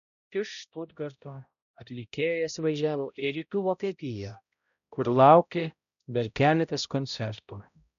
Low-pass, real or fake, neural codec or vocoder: 7.2 kHz; fake; codec, 16 kHz, 0.5 kbps, X-Codec, HuBERT features, trained on balanced general audio